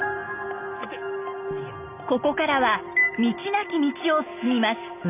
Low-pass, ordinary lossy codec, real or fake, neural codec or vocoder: 3.6 kHz; none; real; none